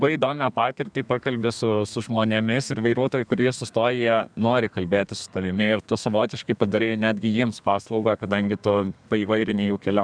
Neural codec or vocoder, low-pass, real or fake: codec, 32 kHz, 1.9 kbps, SNAC; 9.9 kHz; fake